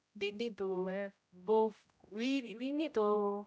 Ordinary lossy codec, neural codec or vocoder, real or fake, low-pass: none; codec, 16 kHz, 0.5 kbps, X-Codec, HuBERT features, trained on general audio; fake; none